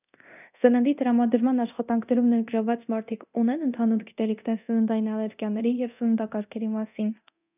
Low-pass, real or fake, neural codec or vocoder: 3.6 kHz; fake; codec, 24 kHz, 0.9 kbps, DualCodec